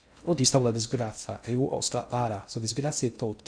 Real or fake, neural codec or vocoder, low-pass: fake; codec, 16 kHz in and 24 kHz out, 0.6 kbps, FocalCodec, streaming, 2048 codes; 9.9 kHz